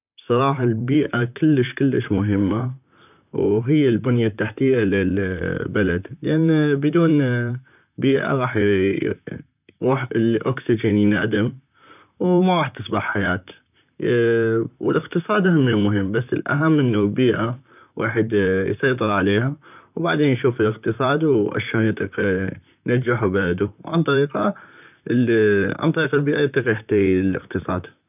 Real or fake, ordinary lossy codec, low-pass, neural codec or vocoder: fake; none; 3.6 kHz; vocoder, 44.1 kHz, 128 mel bands, Pupu-Vocoder